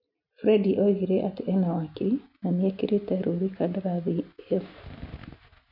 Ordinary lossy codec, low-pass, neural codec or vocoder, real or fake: none; 5.4 kHz; none; real